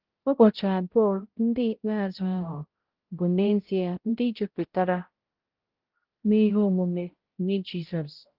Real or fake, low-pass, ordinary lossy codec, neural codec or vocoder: fake; 5.4 kHz; Opus, 16 kbps; codec, 16 kHz, 0.5 kbps, X-Codec, HuBERT features, trained on balanced general audio